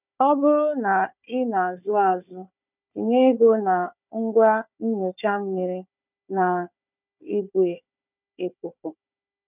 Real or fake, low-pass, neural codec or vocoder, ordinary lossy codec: fake; 3.6 kHz; codec, 16 kHz, 4 kbps, FunCodec, trained on Chinese and English, 50 frames a second; none